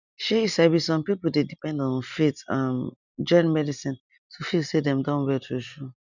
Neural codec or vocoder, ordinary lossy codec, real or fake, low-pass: none; none; real; 7.2 kHz